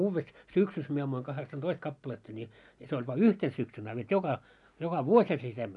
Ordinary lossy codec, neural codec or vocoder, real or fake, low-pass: none; none; real; 10.8 kHz